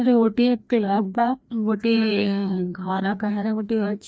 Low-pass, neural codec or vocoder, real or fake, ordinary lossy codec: none; codec, 16 kHz, 1 kbps, FreqCodec, larger model; fake; none